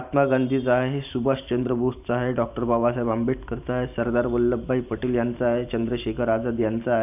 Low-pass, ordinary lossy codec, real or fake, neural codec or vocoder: 3.6 kHz; none; fake; vocoder, 44.1 kHz, 128 mel bands every 512 samples, BigVGAN v2